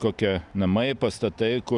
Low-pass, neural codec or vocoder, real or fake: 10.8 kHz; none; real